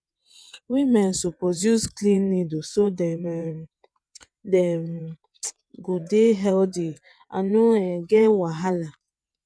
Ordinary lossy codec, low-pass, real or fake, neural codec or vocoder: none; none; fake; vocoder, 22.05 kHz, 80 mel bands, WaveNeXt